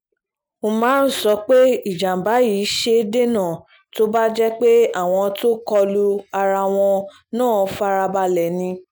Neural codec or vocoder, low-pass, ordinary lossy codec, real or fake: none; none; none; real